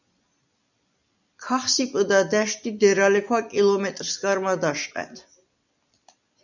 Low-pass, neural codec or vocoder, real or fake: 7.2 kHz; none; real